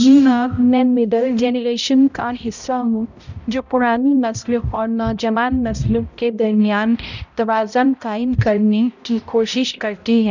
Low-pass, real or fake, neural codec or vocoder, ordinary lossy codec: 7.2 kHz; fake; codec, 16 kHz, 0.5 kbps, X-Codec, HuBERT features, trained on balanced general audio; none